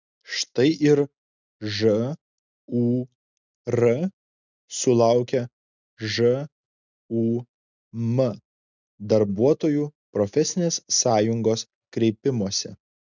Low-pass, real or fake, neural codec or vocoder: 7.2 kHz; real; none